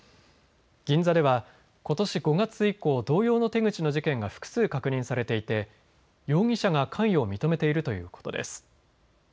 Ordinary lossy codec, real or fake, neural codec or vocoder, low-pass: none; real; none; none